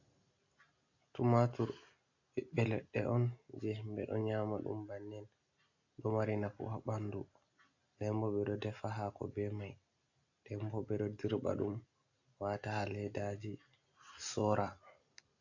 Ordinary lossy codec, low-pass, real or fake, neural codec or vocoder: AAC, 48 kbps; 7.2 kHz; real; none